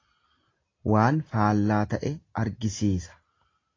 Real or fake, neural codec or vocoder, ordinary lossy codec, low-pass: real; none; AAC, 32 kbps; 7.2 kHz